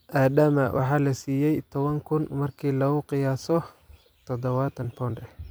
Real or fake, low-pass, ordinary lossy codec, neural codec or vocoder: fake; none; none; vocoder, 44.1 kHz, 128 mel bands every 256 samples, BigVGAN v2